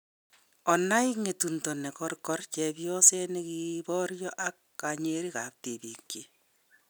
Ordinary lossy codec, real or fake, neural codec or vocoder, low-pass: none; real; none; none